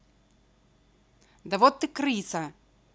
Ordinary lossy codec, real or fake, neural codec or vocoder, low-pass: none; real; none; none